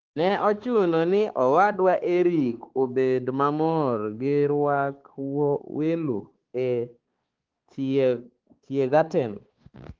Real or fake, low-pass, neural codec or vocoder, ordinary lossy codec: fake; 7.2 kHz; codec, 16 kHz, 4 kbps, X-Codec, HuBERT features, trained on balanced general audio; Opus, 16 kbps